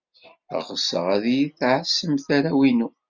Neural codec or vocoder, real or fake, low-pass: none; real; 7.2 kHz